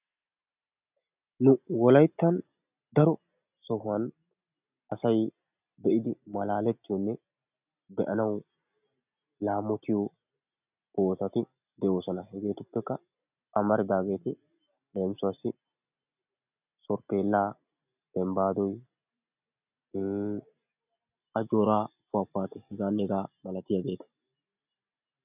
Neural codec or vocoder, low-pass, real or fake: none; 3.6 kHz; real